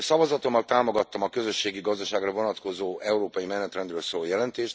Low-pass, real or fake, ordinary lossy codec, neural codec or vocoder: none; real; none; none